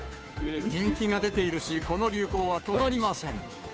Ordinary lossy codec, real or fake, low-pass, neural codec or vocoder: none; fake; none; codec, 16 kHz, 2 kbps, FunCodec, trained on Chinese and English, 25 frames a second